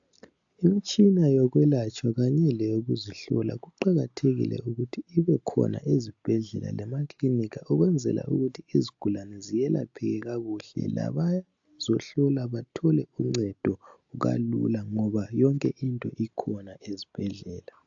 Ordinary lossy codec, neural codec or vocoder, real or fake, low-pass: AAC, 64 kbps; none; real; 7.2 kHz